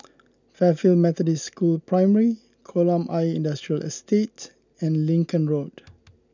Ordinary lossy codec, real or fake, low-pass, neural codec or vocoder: none; real; 7.2 kHz; none